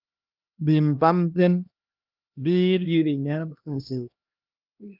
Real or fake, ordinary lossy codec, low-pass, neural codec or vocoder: fake; Opus, 24 kbps; 5.4 kHz; codec, 16 kHz, 1 kbps, X-Codec, HuBERT features, trained on LibriSpeech